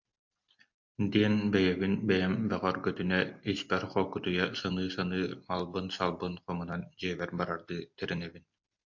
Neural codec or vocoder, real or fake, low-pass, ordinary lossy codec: none; real; 7.2 kHz; MP3, 48 kbps